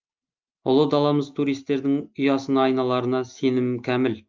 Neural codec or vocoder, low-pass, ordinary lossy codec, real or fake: none; 7.2 kHz; Opus, 24 kbps; real